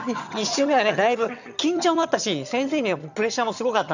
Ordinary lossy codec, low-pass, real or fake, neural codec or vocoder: none; 7.2 kHz; fake; vocoder, 22.05 kHz, 80 mel bands, HiFi-GAN